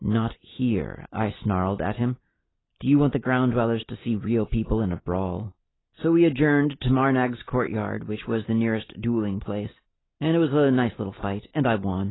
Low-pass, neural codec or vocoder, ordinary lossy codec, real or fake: 7.2 kHz; none; AAC, 16 kbps; real